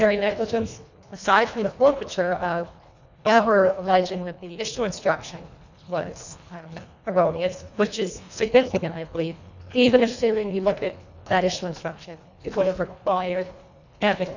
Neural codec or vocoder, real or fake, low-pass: codec, 24 kHz, 1.5 kbps, HILCodec; fake; 7.2 kHz